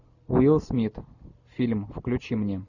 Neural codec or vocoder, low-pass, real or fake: none; 7.2 kHz; real